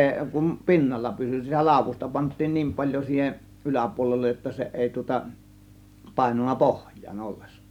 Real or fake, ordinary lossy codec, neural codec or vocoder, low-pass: real; none; none; 19.8 kHz